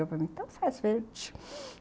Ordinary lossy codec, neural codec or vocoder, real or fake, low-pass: none; none; real; none